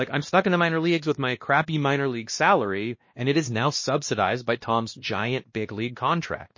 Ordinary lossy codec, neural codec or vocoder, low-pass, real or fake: MP3, 32 kbps; codec, 16 kHz, 1 kbps, X-Codec, WavLM features, trained on Multilingual LibriSpeech; 7.2 kHz; fake